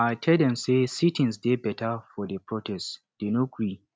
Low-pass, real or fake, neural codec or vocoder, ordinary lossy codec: none; real; none; none